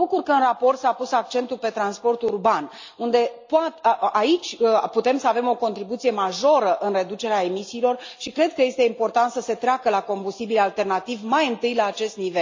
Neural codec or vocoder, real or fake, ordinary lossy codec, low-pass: none; real; MP3, 64 kbps; 7.2 kHz